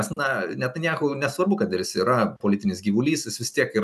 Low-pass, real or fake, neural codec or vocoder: 14.4 kHz; real; none